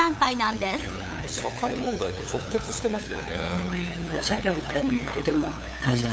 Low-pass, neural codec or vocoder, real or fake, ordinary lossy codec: none; codec, 16 kHz, 8 kbps, FunCodec, trained on LibriTTS, 25 frames a second; fake; none